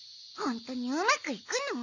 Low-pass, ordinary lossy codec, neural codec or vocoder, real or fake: 7.2 kHz; none; none; real